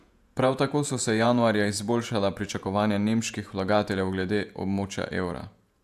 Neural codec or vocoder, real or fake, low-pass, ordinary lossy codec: vocoder, 48 kHz, 128 mel bands, Vocos; fake; 14.4 kHz; AAC, 96 kbps